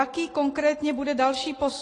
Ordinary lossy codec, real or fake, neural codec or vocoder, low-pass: AAC, 32 kbps; real; none; 10.8 kHz